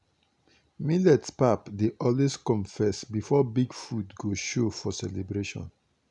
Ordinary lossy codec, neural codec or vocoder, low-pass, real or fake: none; none; 10.8 kHz; real